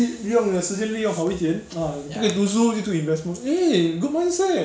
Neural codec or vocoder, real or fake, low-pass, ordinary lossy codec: none; real; none; none